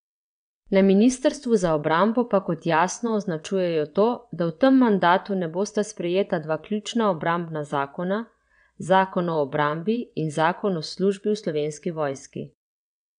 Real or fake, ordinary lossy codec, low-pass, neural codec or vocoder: fake; none; 9.9 kHz; vocoder, 22.05 kHz, 80 mel bands, WaveNeXt